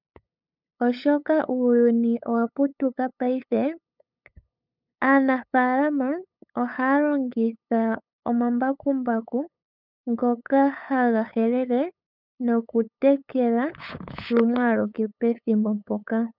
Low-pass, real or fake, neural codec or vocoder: 5.4 kHz; fake; codec, 16 kHz, 8 kbps, FunCodec, trained on LibriTTS, 25 frames a second